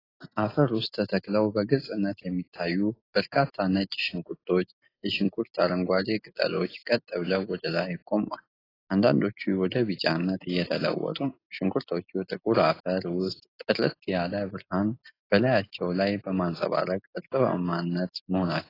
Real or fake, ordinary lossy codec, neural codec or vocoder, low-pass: real; AAC, 24 kbps; none; 5.4 kHz